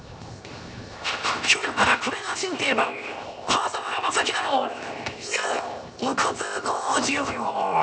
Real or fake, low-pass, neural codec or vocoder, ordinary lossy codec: fake; none; codec, 16 kHz, 0.7 kbps, FocalCodec; none